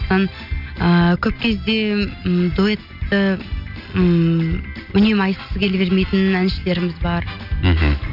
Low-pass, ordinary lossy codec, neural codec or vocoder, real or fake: 5.4 kHz; none; none; real